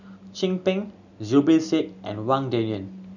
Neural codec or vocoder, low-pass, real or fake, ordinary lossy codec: none; 7.2 kHz; real; none